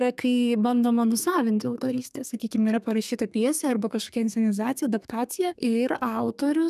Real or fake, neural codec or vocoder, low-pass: fake; codec, 32 kHz, 1.9 kbps, SNAC; 14.4 kHz